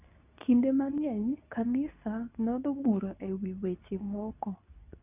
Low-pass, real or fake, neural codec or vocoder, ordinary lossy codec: 3.6 kHz; fake; codec, 24 kHz, 0.9 kbps, WavTokenizer, medium speech release version 2; none